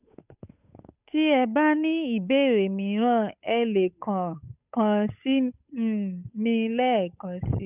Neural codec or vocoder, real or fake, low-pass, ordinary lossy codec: codec, 16 kHz, 8 kbps, FunCodec, trained on Chinese and English, 25 frames a second; fake; 3.6 kHz; none